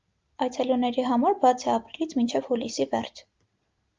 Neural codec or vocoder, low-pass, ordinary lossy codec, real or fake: none; 7.2 kHz; Opus, 32 kbps; real